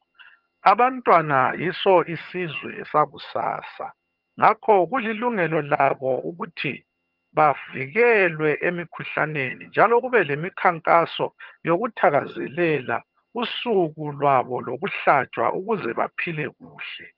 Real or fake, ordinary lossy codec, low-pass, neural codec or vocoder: fake; Opus, 32 kbps; 5.4 kHz; vocoder, 22.05 kHz, 80 mel bands, HiFi-GAN